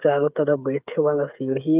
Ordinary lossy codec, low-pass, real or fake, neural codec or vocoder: Opus, 32 kbps; 3.6 kHz; fake; codec, 16 kHz, 8 kbps, FreqCodec, smaller model